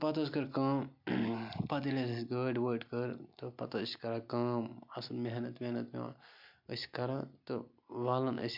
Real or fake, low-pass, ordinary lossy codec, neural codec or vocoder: real; 5.4 kHz; MP3, 48 kbps; none